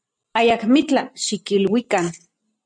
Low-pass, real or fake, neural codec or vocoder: 9.9 kHz; real; none